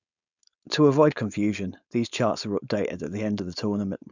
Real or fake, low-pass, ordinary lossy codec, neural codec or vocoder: fake; 7.2 kHz; none; codec, 16 kHz, 4.8 kbps, FACodec